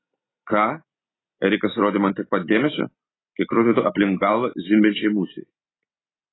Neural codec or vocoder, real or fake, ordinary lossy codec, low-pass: none; real; AAC, 16 kbps; 7.2 kHz